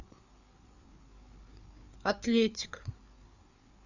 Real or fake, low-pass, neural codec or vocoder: fake; 7.2 kHz; codec, 16 kHz, 4 kbps, FreqCodec, larger model